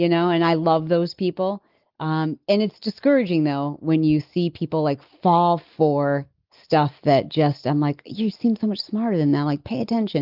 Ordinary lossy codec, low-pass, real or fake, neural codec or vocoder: Opus, 32 kbps; 5.4 kHz; real; none